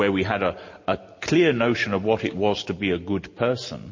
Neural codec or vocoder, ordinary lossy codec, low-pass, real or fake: none; MP3, 32 kbps; 7.2 kHz; real